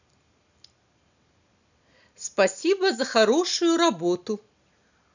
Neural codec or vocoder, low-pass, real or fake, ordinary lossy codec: none; 7.2 kHz; real; none